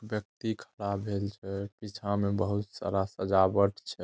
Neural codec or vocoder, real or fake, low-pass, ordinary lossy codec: none; real; none; none